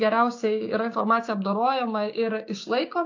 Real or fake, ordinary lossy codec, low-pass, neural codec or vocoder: fake; AAC, 48 kbps; 7.2 kHz; codec, 16 kHz, 6 kbps, DAC